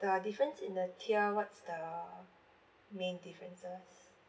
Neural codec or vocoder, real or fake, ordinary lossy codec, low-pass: none; real; none; none